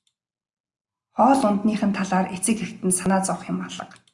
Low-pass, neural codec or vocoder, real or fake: 10.8 kHz; none; real